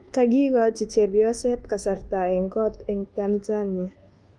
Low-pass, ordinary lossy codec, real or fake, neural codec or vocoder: 10.8 kHz; Opus, 24 kbps; fake; codec, 24 kHz, 1.2 kbps, DualCodec